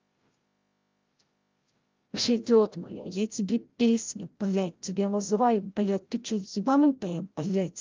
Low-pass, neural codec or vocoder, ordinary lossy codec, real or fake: 7.2 kHz; codec, 16 kHz, 0.5 kbps, FreqCodec, larger model; Opus, 32 kbps; fake